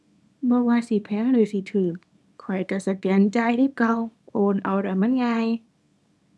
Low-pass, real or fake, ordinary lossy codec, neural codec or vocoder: none; fake; none; codec, 24 kHz, 0.9 kbps, WavTokenizer, small release